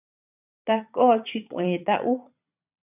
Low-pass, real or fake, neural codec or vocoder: 3.6 kHz; fake; vocoder, 22.05 kHz, 80 mel bands, Vocos